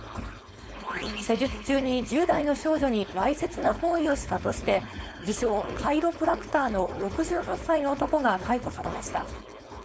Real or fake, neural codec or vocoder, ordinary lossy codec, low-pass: fake; codec, 16 kHz, 4.8 kbps, FACodec; none; none